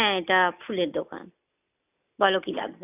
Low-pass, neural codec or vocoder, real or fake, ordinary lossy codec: 3.6 kHz; none; real; none